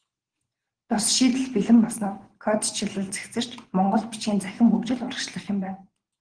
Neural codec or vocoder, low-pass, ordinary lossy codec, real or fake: vocoder, 24 kHz, 100 mel bands, Vocos; 9.9 kHz; Opus, 16 kbps; fake